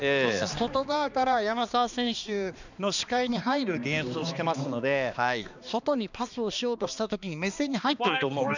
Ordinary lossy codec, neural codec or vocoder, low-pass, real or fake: none; codec, 16 kHz, 2 kbps, X-Codec, HuBERT features, trained on balanced general audio; 7.2 kHz; fake